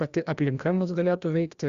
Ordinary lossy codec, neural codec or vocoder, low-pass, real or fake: Opus, 64 kbps; codec, 16 kHz, 1 kbps, FreqCodec, larger model; 7.2 kHz; fake